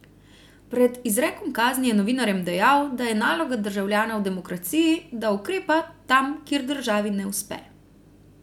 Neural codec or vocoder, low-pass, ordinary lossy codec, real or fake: none; 19.8 kHz; none; real